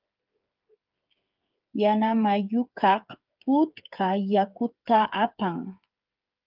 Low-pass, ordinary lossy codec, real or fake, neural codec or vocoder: 5.4 kHz; Opus, 24 kbps; fake; codec, 16 kHz, 8 kbps, FreqCodec, smaller model